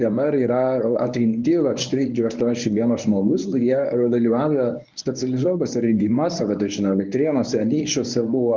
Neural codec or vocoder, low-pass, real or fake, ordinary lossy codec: codec, 24 kHz, 0.9 kbps, WavTokenizer, medium speech release version 1; 7.2 kHz; fake; Opus, 24 kbps